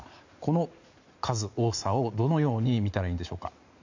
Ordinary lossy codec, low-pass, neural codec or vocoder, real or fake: MP3, 64 kbps; 7.2 kHz; vocoder, 44.1 kHz, 80 mel bands, Vocos; fake